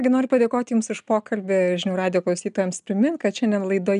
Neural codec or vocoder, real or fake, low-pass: none; real; 10.8 kHz